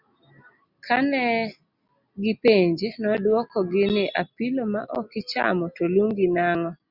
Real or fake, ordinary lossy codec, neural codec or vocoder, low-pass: real; Opus, 64 kbps; none; 5.4 kHz